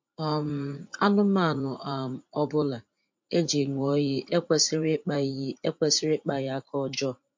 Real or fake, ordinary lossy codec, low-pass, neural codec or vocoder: fake; MP3, 48 kbps; 7.2 kHz; vocoder, 44.1 kHz, 80 mel bands, Vocos